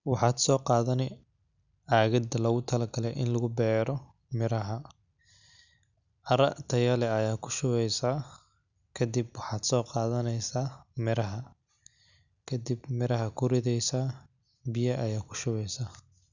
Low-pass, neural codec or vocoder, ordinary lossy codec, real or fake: 7.2 kHz; none; none; real